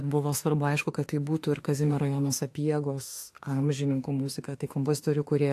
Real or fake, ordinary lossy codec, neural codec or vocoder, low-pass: fake; AAC, 64 kbps; autoencoder, 48 kHz, 32 numbers a frame, DAC-VAE, trained on Japanese speech; 14.4 kHz